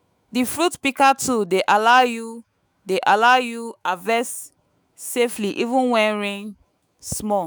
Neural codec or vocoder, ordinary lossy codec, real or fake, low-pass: autoencoder, 48 kHz, 128 numbers a frame, DAC-VAE, trained on Japanese speech; none; fake; none